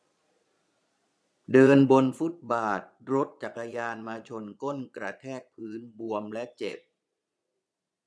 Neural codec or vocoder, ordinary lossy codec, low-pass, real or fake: vocoder, 22.05 kHz, 80 mel bands, Vocos; none; none; fake